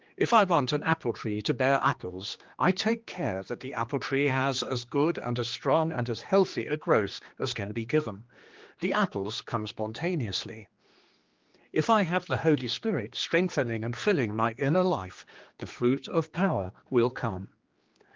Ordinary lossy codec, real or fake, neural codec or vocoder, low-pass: Opus, 32 kbps; fake; codec, 16 kHz, 2 kbps, X-Codec, HuBERT features, trained on general audio; 7.2 kHz